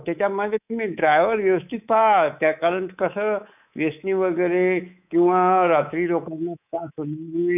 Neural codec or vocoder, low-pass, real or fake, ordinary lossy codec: codec, 24 kHz, 3.1 kbps, DualCodec; 3.6 kHz; fake; none